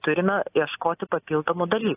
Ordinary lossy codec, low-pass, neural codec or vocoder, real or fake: AAC, 16 kbps; 3.6 kHz; none; real